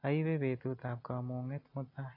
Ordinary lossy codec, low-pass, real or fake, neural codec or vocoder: AAC, 32 kbps; 5.4 kHz; real; none